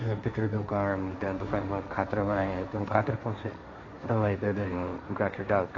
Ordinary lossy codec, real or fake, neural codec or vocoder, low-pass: AAC, 32 kbps; fake; codec, 16 kHz, 1.1 kbps, Voila-Tokenizer; 7.2 kHz